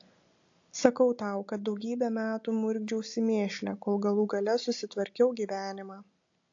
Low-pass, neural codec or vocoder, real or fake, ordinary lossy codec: 7.2 kHz; none; real; AAC, 48 kbps